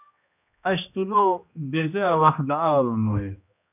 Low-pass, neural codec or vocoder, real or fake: 3.6 kHz; codec, 16 kHz, 1 kbps, X-Codec, HuBERT features, trained on general audio; fake